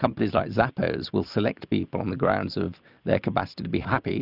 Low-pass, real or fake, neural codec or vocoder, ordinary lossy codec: 5.4 kHz; real; none; Opus, 64 kbps